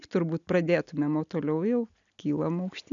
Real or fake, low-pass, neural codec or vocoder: real; 7.2 kHz; none